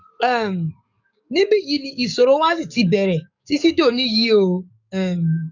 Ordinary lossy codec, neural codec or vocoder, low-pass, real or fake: none; codec, 44.1 kHz, 7.8 kbps, DAC; 7.2 kHz; fake